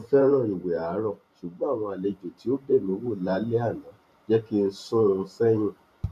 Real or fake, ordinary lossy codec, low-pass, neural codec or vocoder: fake; none; 14.4 kHz; vocoder, 44.1 kHz, 128 mel bands every 512 samples, BigVGAN v2